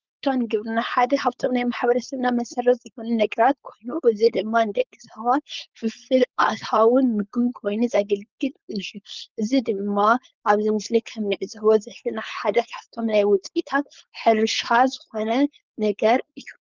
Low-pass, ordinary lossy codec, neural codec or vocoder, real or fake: 7.2 kHz; Opus, 32 kbps; codec, 16 kHz, 4.8 kbps, FACodec; fake